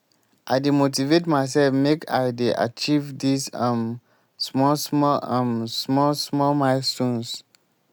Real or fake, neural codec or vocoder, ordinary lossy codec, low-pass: real; none; none; none